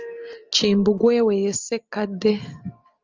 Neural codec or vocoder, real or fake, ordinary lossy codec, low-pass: none; real; Opus, 24 kbps; 7.2 kHz